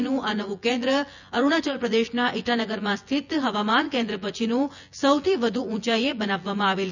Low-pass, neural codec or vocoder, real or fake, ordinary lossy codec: 7.2 kHz; vocoder, 24 kHz, 100 mel bands, Vocos; fake; none